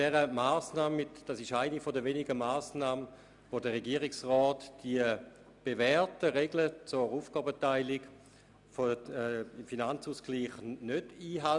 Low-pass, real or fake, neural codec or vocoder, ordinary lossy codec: 10.8 kHz; real; none; none